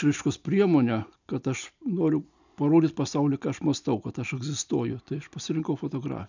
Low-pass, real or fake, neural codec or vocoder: 7.2 kHz; real; none